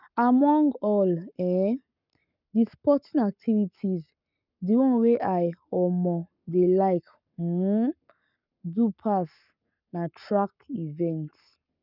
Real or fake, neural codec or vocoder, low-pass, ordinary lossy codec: fake; codec, 44.1 kHz, 7.8 kbps, Pupu-Codec; 5.4 kHz; none